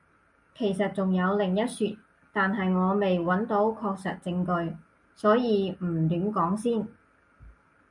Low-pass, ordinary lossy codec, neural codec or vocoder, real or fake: 10.8 kHz; MP3, 96 kbps; none; real